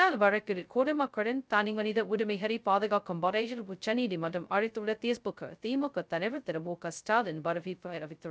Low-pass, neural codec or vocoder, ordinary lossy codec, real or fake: none; codec, 16 kHz, 0.2 kbps, FocalCodec; none; fake